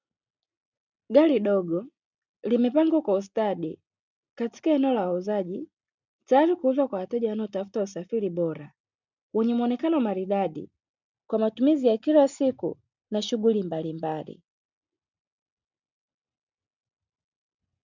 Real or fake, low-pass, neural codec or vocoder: real; 7.2 kHz; none